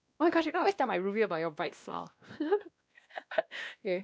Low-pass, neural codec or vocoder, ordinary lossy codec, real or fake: none; codec, 16 kHz, 1 kbps, X-Codec, WavLM features, trained on Multilingual LibriSpeech; none; fake